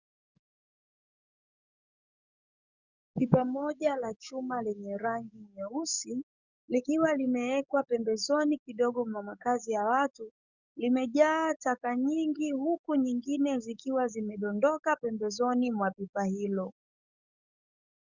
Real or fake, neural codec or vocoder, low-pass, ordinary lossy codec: fake; codec, 44.1 kHz, 7.8 kbps, DAC; 7.2 kHz; Opus, 64 kbps